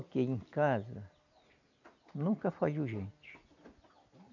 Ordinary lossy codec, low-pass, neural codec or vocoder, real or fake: none; 7.2 kHz; none; real